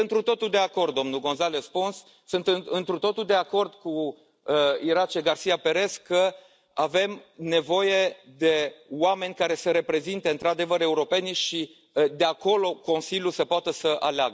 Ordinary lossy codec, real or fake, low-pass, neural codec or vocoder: none; real; none; none